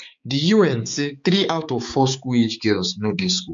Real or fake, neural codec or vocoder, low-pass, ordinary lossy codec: fake; codec, 16 kHz, 4 kbps, X-Codec, HuBERT features, trained on balanced general audio; 7.2 kHz; MP3, 48 kbps